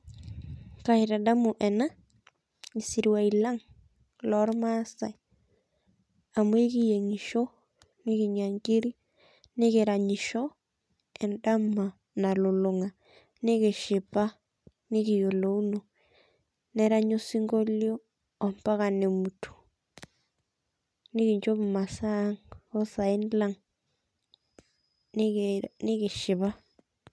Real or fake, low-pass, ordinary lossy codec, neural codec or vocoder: real; none; none; none